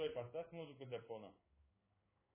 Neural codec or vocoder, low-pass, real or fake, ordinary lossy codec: codec, 16 kHz in and 24 kHz out, 1 kbps, XY-Tokenizer; 3.6 kHz; fake; MP3, 16 kbps